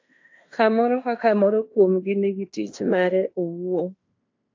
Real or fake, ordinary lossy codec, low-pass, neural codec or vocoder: fake; AAC, 48 kbps; 7.2 kHz; codec, 16 kHz in and 24 kHz out, 0.9 kbps, LongCat-Audio-Codec, fine tuned four codebook decoder